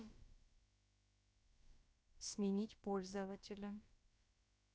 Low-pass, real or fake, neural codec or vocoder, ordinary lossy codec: none; fake; codec, 16 kHz, about 1 kbps, DyCAST, with the encoder's durations; none